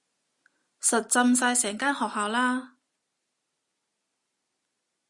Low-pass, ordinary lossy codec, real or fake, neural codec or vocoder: 10.8 kHz; Opus, 64 kbps; real; none